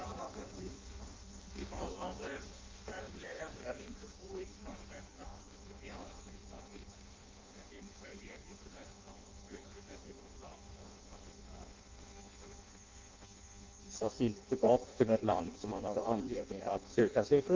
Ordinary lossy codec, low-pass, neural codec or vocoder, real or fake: Opus, 24 kbps; 7.2 kHz; codec, 16 kHz in and 24 kHz out, 0.6 kbps, FireRedTTS-2 codec; fake